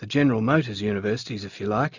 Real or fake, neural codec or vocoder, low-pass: real; none; 7.2 kHz